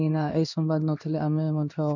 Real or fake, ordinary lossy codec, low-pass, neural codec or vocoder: fake; none; 7.2 kHz; codec, 16 kHz in and 24 kHz out, 1 kbps, XY-Tokenizer